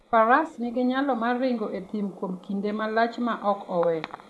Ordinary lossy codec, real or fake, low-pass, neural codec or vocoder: none; fake; none; vocoder, 24 kHz, 100 mel bands, Vocos